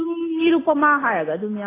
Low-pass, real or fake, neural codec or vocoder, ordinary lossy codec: 3.6 kHz; real; none; AAC, 16 kbps